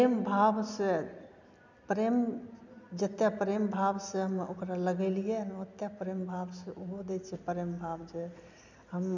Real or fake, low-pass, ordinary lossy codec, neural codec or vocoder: real; 7.2 kHz; none; none